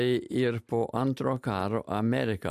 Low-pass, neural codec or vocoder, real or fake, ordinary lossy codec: 14.4 kHz; none; real; MP3, 96 kbps